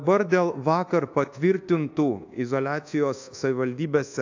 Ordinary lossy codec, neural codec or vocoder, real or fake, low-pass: AAC, 48 kbps; codec, 24 kHz, 1.2 kbps, DualCodec; fake; 7.2 kHz